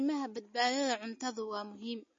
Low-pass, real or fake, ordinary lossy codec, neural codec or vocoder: 7.2 kHz; real; MP3, 32 kbps; none